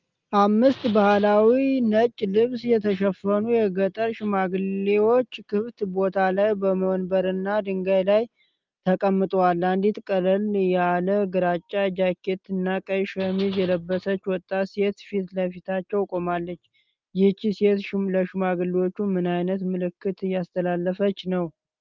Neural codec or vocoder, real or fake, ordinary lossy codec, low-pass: none; real; Opus, 32 kbps; 7.2 kHz